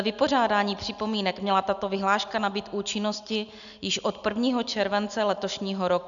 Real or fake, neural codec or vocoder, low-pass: real; none; 7.2 kHz